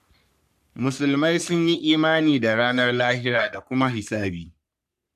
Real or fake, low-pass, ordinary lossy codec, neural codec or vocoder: fake; 14.4 kHz; none; codec, 44.1 kHz, 3.4 kbps, Pupu-Codec